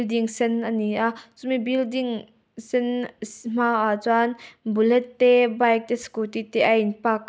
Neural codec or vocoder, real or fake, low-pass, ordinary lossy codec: none; real; none; none